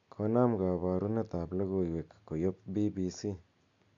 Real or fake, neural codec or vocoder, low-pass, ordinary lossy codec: real; none; 7.2 kHz; AAC, 64 kbps